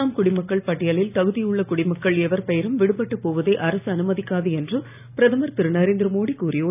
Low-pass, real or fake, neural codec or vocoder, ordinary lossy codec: 3.6 kHz; real; none; none